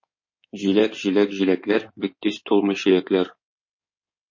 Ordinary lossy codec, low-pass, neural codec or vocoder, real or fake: MP3, 32 kbps; 7.2 kHz; codec, 16 kHz in and 24 kHz out, 2.2 kbps, FireRedTTS-2 codec; fake